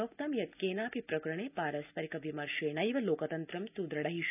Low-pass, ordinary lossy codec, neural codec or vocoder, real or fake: 3.6 kHz; none; none; real